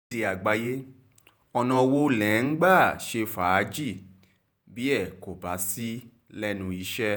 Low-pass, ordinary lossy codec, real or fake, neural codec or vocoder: none; none; fake; vocoder, 48 kHz, 128 mel bands, Vocos